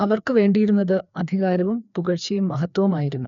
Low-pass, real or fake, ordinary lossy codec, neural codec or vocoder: 7.2 kHz; fake; none; codec, 16 kHz, 2 kbps, FreqCodec, larger model